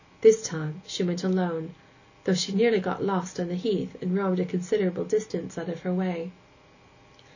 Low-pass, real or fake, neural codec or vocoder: 7.2 kHz; real; none